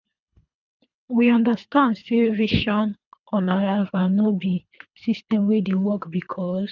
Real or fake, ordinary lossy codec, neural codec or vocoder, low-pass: fake; none; codec, 24 kHz, 3 kbps, HILCodec; 7.2 kHz